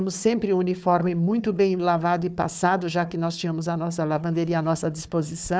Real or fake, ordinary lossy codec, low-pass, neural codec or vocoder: fake; none; none; codec, 16 kHz, 4 kbps, FunCodec, trained on Chinese and English, 50 frames a second